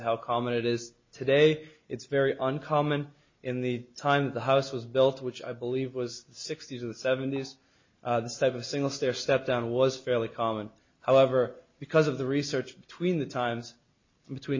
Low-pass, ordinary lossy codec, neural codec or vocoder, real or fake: 7.2 kHz; MP3, 32 kbps; none; real